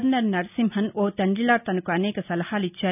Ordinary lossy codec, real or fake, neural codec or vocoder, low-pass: none; real; none; 3.6 kHz